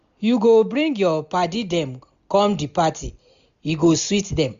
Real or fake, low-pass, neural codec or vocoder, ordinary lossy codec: real; 7.2 kHz; none; MP3, 64 kbps